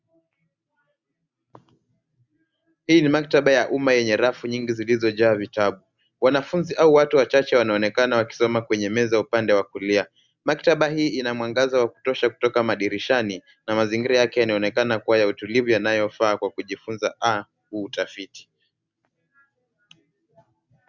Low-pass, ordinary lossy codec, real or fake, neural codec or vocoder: 7.2 kHz; Opus, 64 kbps; real; none